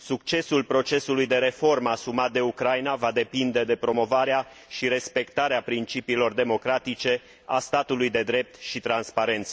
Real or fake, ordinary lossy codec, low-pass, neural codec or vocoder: real; none; none; none